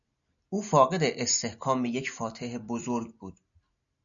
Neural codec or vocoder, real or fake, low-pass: none; real; 7.2 kHz